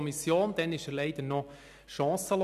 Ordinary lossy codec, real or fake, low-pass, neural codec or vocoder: none; real; 14.4 kHz; none